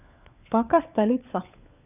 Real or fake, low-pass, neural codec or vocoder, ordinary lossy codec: fake; 3.6 kHz; codec, 16 kHz, 4 kbps, FunCodec, trained on LibriTTS, 50 frames a second; none